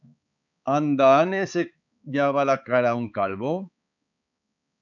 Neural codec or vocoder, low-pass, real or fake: codec, 16 kHz, 4 kbps, X-Codec, HuBERT features, trained on balanced general audio; 7.2 kHz; fake